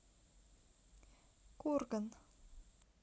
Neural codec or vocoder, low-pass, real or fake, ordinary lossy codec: none; none; real; none